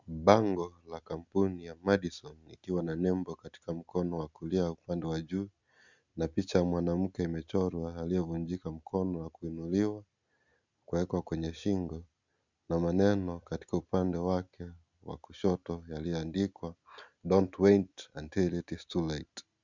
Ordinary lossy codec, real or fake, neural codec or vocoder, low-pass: Opus, 64 kbps; real; none; 7.2 kHz